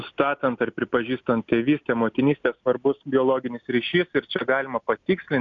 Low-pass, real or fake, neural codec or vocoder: 7.2 kHz; real; none